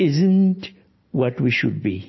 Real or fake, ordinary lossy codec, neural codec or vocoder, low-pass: real; MP3, 24 kbps; none; 7.2 kHz